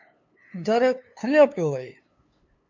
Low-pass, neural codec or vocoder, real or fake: 7.2 kHz; codec, 16 kHz, 2 kbps, FunCodec, trained on LibriTTS, 25 frames a second; fake